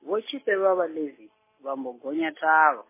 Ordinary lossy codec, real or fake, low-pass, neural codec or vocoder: MP3, 16 kbps; real; 3.6 kHz; none